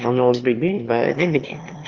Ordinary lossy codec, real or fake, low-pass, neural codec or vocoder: Opus, 32 kbps; fake; 7.2 kHz; autoencoder, 22.05 kHz, a latent of 192 numbers a frame, VITS, trained on one speaker